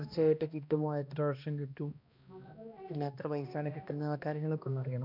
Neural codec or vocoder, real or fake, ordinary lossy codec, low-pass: codec, 16 kHz, 1 kbps, X-Codec, HuBERT features, trained on balanced general audio; fake; none; 5.4 kHz